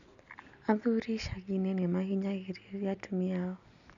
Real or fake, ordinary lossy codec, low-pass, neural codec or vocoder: real; none; 7.2 kHz; none